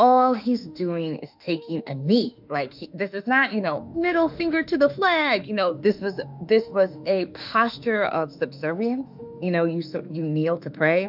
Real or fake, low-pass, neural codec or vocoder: fake; 5.4 kHz; autoencoder, 48 kHz, 32 numbers a frame, DAC-VAE, trained on Japanese speech